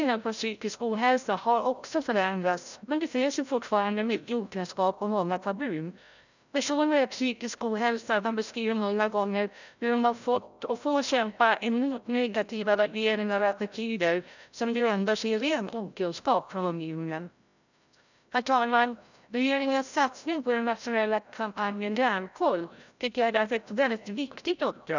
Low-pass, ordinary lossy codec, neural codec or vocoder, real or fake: 7.2 kHz; none; codec, 16 kHz, 0.5 kbps, FreqCodec, larger model; fake